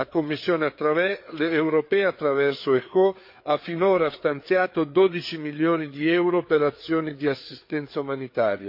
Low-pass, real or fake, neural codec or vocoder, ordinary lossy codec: 5.4 kHz; fake; codec, 16 kHz, 4 kbps, FreqCodec, larger model; MP3, 32 kbps